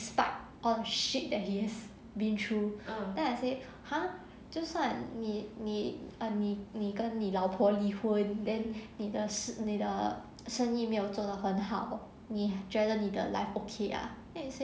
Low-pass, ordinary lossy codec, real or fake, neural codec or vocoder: none; none; real; none